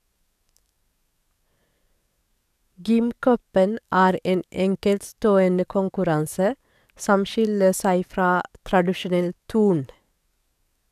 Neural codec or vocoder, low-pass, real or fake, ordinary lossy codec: codec, 44.1 kHz, 7.8 kbps, DAC; 14.4 kHz; fake; none